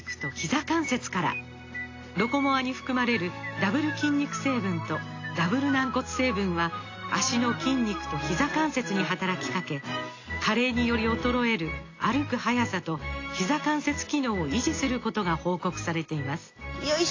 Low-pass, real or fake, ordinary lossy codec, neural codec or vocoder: 7.2 kHz; real; AAC, 32 kbps; none